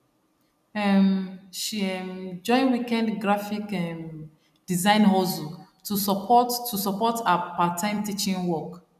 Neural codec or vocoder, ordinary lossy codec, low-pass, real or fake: none; none; 14.4 kHz; real